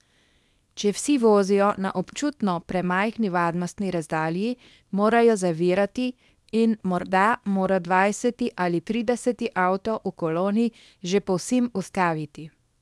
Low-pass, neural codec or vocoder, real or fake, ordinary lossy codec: none; codec, 24 kHz, 0.9 kbps, WavTokenizer, small release; fake; none